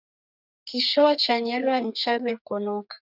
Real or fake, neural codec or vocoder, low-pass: fake; codec, 32 kHz, 1.9 kbps, SNAC; 5.4 kHz